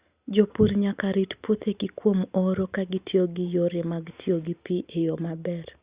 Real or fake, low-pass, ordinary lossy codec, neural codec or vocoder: real; 3.6 kHz; Opus, 64 kbps; none